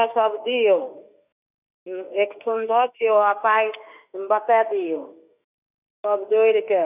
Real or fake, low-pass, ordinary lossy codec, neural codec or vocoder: fake; 3.6 kHz; none; autoencoder, 48 kHz, 32 numbers a frame, DAC-VAE, trained on Japanese speech